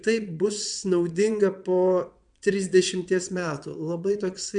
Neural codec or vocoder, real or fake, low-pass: vocoder, 22.05 kHz, 80 mel bands, WaveNeXt; fake; 9.9 kHz